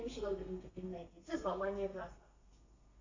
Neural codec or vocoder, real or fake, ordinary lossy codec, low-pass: codec, 32 kHz, 1.9 kbps, SNAC; fake; AAC, 48 kbps; 7.2 kHz